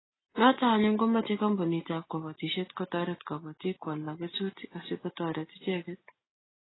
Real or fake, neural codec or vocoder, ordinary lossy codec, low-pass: real; none; AAC, 16 kbps; 7.2 kHz